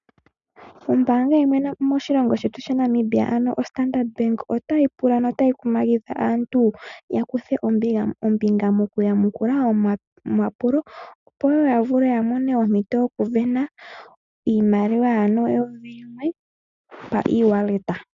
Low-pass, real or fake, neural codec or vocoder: 7.2 kHz; real; none